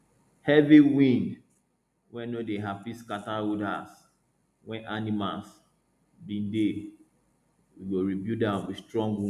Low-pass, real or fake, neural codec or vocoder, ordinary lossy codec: 14.4 kHz; real; none; none